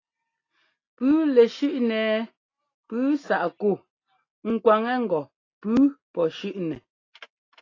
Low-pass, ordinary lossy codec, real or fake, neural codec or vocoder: 7.2 kHz; AAC, 32 kbps; real; none